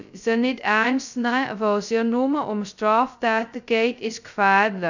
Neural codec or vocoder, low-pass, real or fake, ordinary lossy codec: codec, 16 kHz, 0.2 kbps, FocalCodec; 7.2 kHz; fake; none